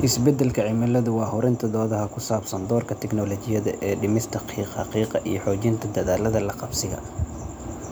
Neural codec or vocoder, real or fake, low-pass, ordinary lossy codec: none; real; none; none